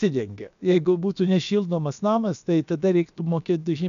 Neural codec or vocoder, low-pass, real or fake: codec, 16 kHz, about 1 kbps, DyCAST, with the encoder's durations; 7.2 kHz; fake